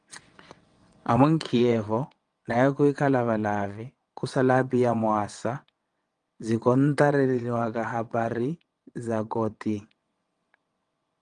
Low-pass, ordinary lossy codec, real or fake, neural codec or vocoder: 9.9 kHz; Opus, 32 kbps; fake; vocoder, 22.05 kHz, 80 mel bands, WaveNeXt